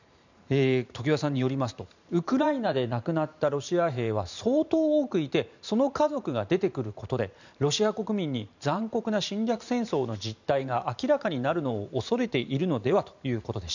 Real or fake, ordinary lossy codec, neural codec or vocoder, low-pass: fake; none; vocoder, 44.1 kHz, 128 mel bands every 512 samples, BigVGAN v2; 7.2 kHz